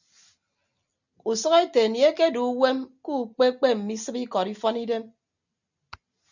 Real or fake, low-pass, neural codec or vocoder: real; 7.2 kHz; none